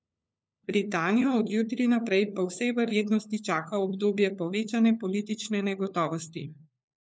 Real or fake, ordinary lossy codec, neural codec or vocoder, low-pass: fake; none; codec, 16 kHz, 4 kbps, FunCodec, trained on LibriTTS, 50 frames a second; none